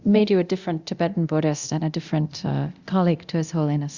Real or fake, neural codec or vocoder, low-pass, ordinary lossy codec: fake; codec, 24 kHz, 0.9 kbps, DualCodec; 7.2 kHz; Opus, 64 kbps